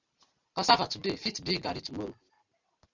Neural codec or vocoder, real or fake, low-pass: none; real; 7.2 kHz